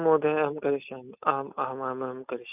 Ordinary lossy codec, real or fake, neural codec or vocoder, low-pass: none; real; none; 3.6 kHz